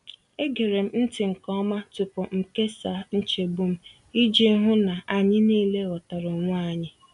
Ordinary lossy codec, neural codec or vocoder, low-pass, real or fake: none; none; 10.8 kHz; real